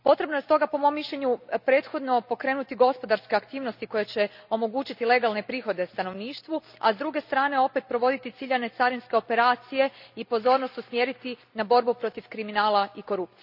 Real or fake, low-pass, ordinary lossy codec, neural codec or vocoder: real; 5.4 kHz; none; none